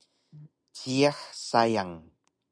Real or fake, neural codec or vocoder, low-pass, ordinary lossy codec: real; none; 9.9 kHz; MP3, 96 kbps